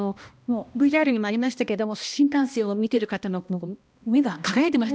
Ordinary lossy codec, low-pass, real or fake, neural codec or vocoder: none; none; fake; codec, 16 kHz, 1 kbps, X-Codec, HuBERT features, trained on balanced general audio